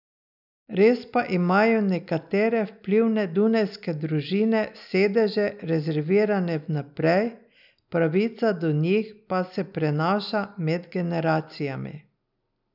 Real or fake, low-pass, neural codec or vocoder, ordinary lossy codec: real; 5.4 kHz; none; none